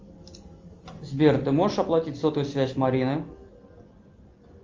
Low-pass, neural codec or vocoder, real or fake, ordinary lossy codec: 7.2 kHz; none; real; Opus, 32 kbps